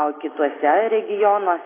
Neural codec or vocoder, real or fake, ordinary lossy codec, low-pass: none; real; AAC, 16 kbps; 3.6 kHz